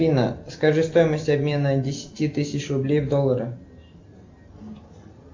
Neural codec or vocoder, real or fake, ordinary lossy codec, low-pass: none; real; AAC, 48 kbps; 7.2 kHz